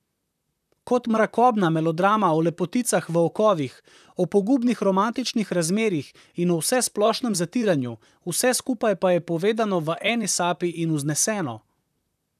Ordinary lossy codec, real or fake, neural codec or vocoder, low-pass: none; fake; vocoder, 44.1 kHz, 128 mel bands, Pupu-Vocoder; 14.4 kHz